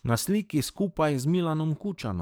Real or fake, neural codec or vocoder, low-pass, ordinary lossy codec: fake; codec, 44.1 kHz, 7.8 kbps, DAC; none; none